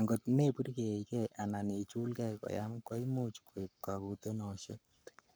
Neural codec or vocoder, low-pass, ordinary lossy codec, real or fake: codec, 44.1 kHz, 7.8 kbps, Pupu-Codec; none; none; fake